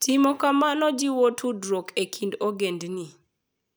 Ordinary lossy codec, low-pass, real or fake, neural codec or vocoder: none; none; real; none